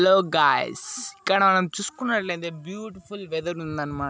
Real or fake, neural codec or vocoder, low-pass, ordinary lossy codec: real; none; none; none